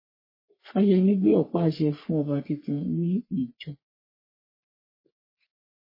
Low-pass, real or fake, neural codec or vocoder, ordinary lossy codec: 5.4 kHz; fake; codec, 44.1 kHz, 3.4 kbps, Pupu-Codec; MP3, 24 kbps